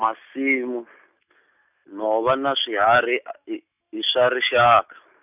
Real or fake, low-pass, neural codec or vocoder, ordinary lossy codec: real; 3.6 kHz; none; none